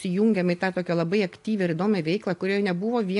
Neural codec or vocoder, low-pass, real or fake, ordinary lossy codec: none; 10.8 kHz; real; AAC, 64 kbps